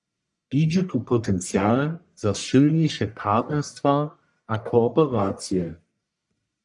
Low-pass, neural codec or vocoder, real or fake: 10.8 kHz; codec, 44.1 kHz, 1.7 kbps, Pupu-Codec; fake